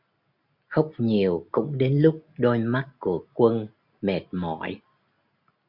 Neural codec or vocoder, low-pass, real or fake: none; 5.4 kHz; real